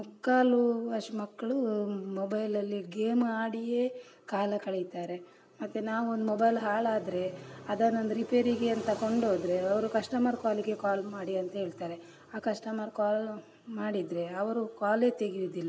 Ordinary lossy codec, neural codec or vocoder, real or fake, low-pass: none; none; real; none